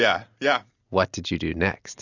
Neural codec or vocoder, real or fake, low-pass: none; real; 7.2 kHz